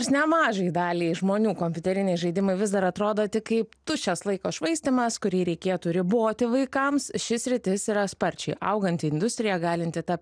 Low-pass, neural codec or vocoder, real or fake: 9.9 kHz; none; real